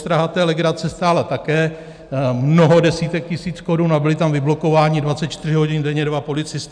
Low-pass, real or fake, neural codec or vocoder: 9.9 kHz; real; none